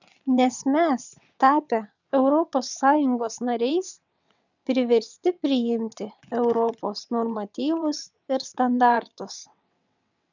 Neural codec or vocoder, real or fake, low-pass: vocoder, 22.05 kHz, 80 mel bands, WaveNeXt; fake; 7.2 kHz